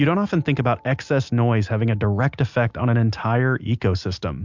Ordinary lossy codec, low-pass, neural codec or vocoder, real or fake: MP3, 64 kbps; 7.2 kHz; none; real